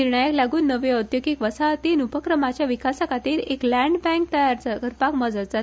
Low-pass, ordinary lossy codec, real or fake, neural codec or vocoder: none; none; real; none